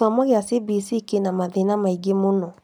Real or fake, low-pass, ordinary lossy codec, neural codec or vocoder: real; 19.8 kHz; none; none